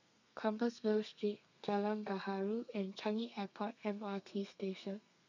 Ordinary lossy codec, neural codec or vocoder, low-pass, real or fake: none; codec, 32 kHz, 1.9 kbps, SNAC; 7.2 kHz; fake